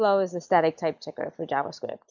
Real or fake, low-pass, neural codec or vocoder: real; 7.2 kHz; none